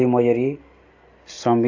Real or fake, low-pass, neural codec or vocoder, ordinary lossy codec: real; 7.2 kHz; none; AAC, 48 kbps